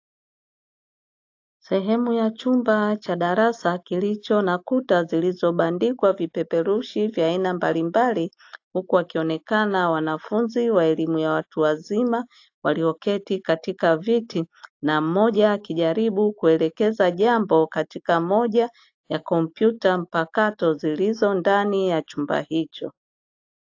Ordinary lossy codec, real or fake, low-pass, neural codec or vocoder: AAC, 48 kbps; real; 7.2 kHz; none